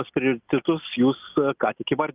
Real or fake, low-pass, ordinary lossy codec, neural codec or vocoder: real; 3.6 kHz; Opus, 24 kbps; none